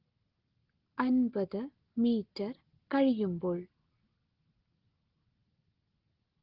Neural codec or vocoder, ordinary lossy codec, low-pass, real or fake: none; Opus, 16 kbps; 5.4 kHz; real